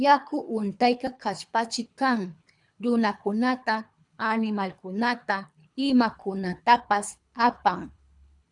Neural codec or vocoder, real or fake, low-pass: codec, 24 kHz, 3 kbps, HILCodec; fake; 10.8 kHz